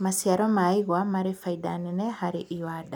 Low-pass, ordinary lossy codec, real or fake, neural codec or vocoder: none; none; real; none